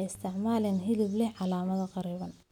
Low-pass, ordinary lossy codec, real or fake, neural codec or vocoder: 19.8 kHz; none; real; none